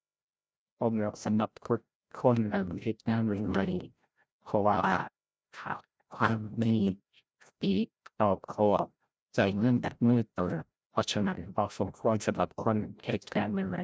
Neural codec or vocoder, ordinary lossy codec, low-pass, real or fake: codec, 16 kHz, 0.5 kbps, FreqCodec, larger model; none; none; fake